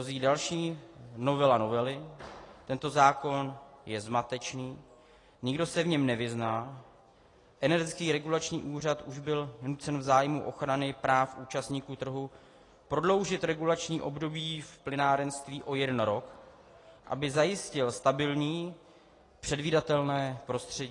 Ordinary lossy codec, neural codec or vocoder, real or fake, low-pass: AAC, 32 kbps; none; real; 10.8 kHz